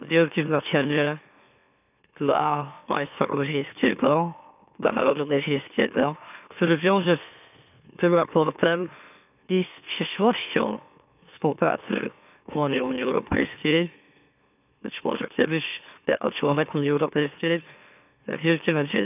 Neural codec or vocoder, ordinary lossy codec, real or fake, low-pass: autoencoder, 44.1 kHz, a latent of 192 numbers a frame, MeloTTS; none; fake; 3.6 kHz